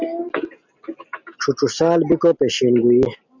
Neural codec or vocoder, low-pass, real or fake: none; 7.2 kHz; real